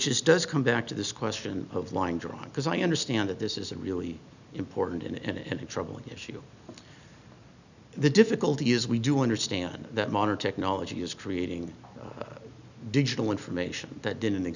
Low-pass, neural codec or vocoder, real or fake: 7.2 kHz; none; real